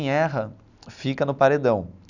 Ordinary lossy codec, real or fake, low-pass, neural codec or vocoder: none; real; 7.2 kHz; none